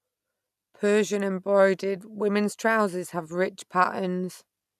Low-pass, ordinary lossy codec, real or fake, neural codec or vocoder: 14.4 kHz; none; real; none